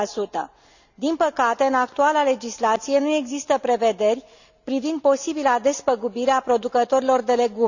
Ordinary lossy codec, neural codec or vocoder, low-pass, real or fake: none; none; 7.2 kHz; real